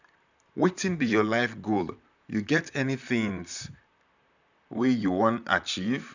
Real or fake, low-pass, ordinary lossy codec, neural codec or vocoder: fake; 7.2 kHz; none; vocoder, 44.1 kHz, 128 mel bands, Pupu-Vocoder